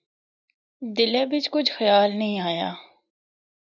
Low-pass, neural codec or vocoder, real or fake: 7.2 kHz; none; real